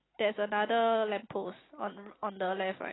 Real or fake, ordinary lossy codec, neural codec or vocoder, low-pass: real; AAC, 16 kbps; none; 7.2 kHz